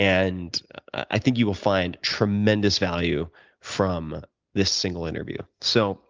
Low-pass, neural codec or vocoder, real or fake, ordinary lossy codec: 7.2 kHz; none; real; Opus, 24 kbps